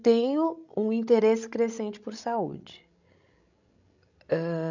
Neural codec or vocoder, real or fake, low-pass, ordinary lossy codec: codec, 16 kHz, 16 kbps, FreqCodec, larger model; fake; 7.2 kHz; none